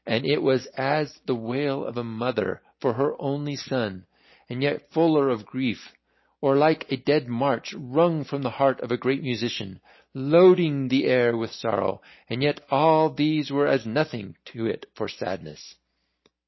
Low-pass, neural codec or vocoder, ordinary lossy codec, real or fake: 7.2 kHz; none; MP3, 24 kbps; real